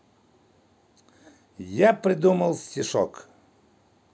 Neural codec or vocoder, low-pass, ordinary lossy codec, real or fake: none; none; none; real